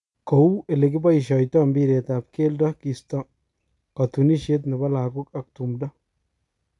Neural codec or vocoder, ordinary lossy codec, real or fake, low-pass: none; none; real; 10.8 kHz